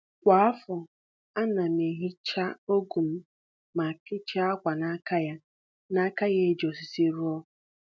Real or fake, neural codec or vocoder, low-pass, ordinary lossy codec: real; none; none; none